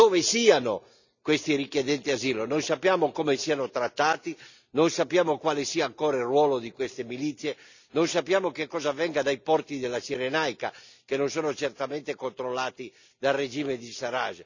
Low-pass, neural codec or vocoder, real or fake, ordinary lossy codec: 7.2 kHz; none; real; none